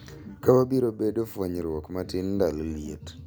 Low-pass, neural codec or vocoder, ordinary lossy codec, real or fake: none; none; none; real